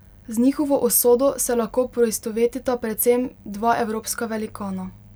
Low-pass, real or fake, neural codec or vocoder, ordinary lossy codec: none; real; none; none